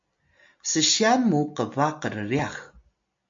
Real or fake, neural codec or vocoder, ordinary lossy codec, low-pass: real; none; MP3, 48 kbps; 7.2 kHz